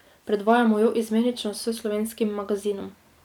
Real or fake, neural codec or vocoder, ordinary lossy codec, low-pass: fake; vocoder, 44.1 kHz, 128 mel bands every 256 samples, BigVGAN v2; none; 19.8 kHz